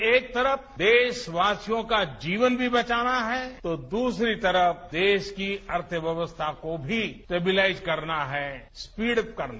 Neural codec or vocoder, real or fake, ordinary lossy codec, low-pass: none; real; none; none